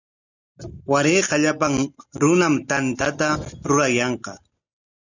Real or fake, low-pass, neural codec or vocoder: real; 7.2 kHz; none